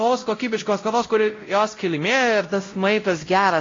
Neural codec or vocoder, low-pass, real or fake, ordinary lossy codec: codec, 16 kHz, 0.5 kbps, X-Codec, WavLM features, trained on Multilingual LibriSpeech; 7.2 kHz; fake; AAC, 32 kbps